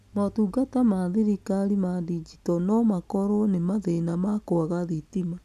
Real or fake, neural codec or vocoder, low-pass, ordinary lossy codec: real; none; 14.4 kHz; none